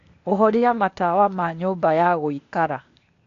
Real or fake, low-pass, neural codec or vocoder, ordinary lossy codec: fake; 7.2 kHz; codec, 16 kHz, 0.8 kbps, ZipCodec; AAC, 48 kbps